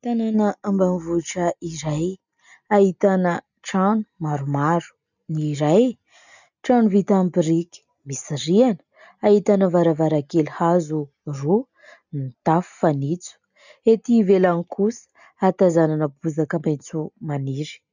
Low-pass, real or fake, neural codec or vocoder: 7.2 kHz; real; none